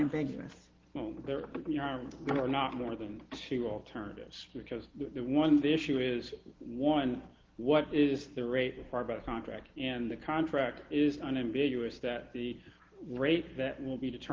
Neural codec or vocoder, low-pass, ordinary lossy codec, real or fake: none; 7.2 kHz; Opus, 16 kbps; real